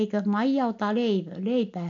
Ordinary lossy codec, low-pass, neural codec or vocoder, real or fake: AAC, 48 kbps; 7.2 kHz; none; real